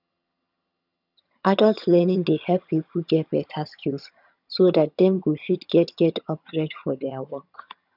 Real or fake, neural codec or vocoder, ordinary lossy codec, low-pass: fake; vocoder, 22.05 kHz, 80 mel bands, HiFi-GAN; none; 5.4 kHz